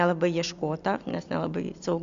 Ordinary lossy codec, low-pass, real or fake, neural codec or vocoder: MP3, 64 kbps; 7.2 kHz; real; none